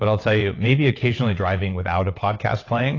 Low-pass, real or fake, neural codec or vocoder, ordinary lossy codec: 7.2 kHz; fake; vocoder, 22.05 kHz, 80 mel bands, WaveNeXt; AAC, 32 kbps